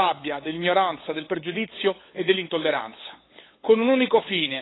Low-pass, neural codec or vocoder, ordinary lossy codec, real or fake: 7.2 kHz; codec, 16 kHz, 8 kbps, FunCodec, trained on LibriTTS, 25 frames a second; AAC, 16 kbps; fake